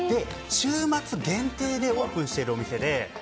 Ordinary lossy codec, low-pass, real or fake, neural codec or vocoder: none; none; real; none